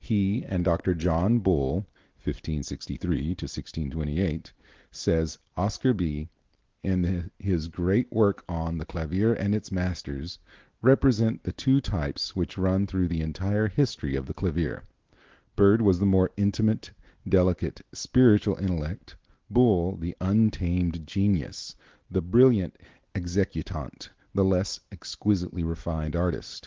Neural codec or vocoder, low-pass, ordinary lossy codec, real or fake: none; 7.2 kHz; Opus, 16 kbps; real